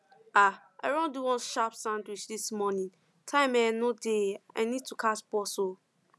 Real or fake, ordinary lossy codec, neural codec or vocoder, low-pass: real; none; none; none